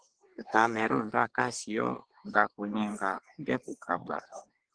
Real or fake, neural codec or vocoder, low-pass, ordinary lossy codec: fake; codec, 24 kHz, 1 kbps, SNAC; 9.9 kHz; Opus, 16 kbps